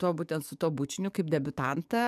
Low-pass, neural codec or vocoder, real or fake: 14.4 kHz; codec, 44.1 kHz, 7.8 kbps, DAC; fake